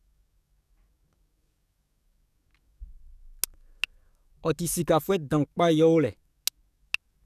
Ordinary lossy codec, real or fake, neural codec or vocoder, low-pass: none; fake; codec, 44.1 kHz, 7.8 kbps, DAC; 14.4 kHz